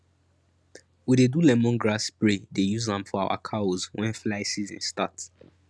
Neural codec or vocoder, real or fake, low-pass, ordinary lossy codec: none; real; none; none